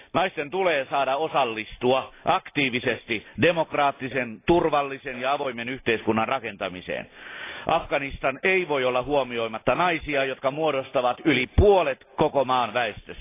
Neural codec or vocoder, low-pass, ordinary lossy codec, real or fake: none; 3.6 kHz; AAC, 24 kbps; real